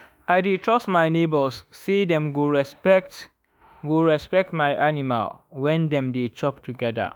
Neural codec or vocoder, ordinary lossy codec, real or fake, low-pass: autoencoder, 48 kHz, 32 numbers a frame, DAC-VAE, trained on Japanese speech; none; fake; none